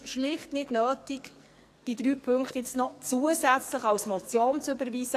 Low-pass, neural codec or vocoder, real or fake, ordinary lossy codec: 14.4 kHz; codec, 44.1 kHz, 2.6 kbps, SNAC; fake; AAC, 64 kbps